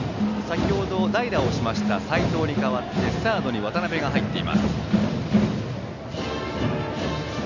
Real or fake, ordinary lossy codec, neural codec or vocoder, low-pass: real; none; none; 7.2 kHz